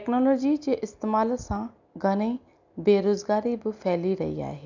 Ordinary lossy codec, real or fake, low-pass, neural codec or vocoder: none; real; 7.2 kHz; none